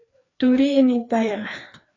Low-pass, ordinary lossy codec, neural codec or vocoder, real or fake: 7.2 kHz; AAC, 32 kbps; codec, 16 kHz, 2 kbps, FreqCodec, larger model; fake